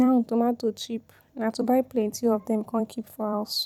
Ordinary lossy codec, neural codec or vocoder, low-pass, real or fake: none; vocoder, 44.1 kHz, 128 mel bands every 256 samples, BigVGAN v2; 19.8 kHz; fake